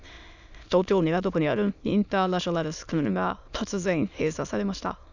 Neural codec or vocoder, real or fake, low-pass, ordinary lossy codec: autoencoder, 22.05 kHz, a latent of 192 numbers a frame, VITS, trained on many speakers; fake; 7.2 kHz; none